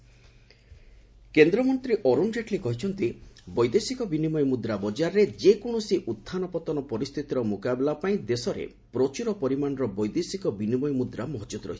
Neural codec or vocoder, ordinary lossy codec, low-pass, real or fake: none; none; none; real